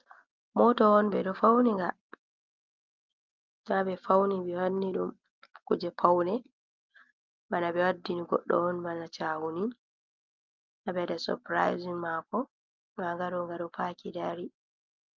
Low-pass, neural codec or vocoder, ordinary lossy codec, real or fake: 7.2 kHz; none; Opus, 32 kbps; real